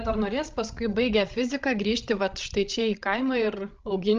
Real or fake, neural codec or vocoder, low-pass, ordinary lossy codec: fake; codec, 16 kHz, 16 kbps, FreqCodec, larger model; 7.2 kHz; Opus, 24 kbps